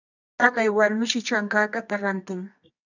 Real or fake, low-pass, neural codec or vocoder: fake; 7.2 kHz; codec, 24 kHz, 0.9 kbps, WavTokenizer, medium music audio release